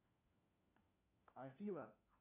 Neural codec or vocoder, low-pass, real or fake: codec, 16 kHz, 1 kbps, FunCodec, trained on LibriTTS, 50 frames a second; 3.6 kHz; fake